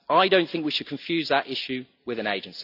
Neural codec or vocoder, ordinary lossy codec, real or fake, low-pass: none; none; real; 5.4 kHz